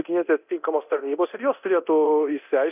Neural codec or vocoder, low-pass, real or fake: codec, 24 kHz, 0.9 kbps, DualCodec; 3.6 kHz; fake